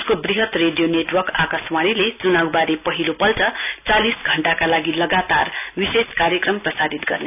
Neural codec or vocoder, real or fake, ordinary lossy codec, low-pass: none; real; none; 3.6 kHz